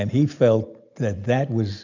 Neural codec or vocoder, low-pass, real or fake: none; 7.2 kHz; real